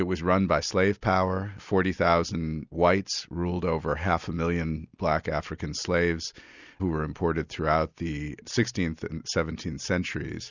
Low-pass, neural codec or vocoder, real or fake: 7.2 kHz; none; real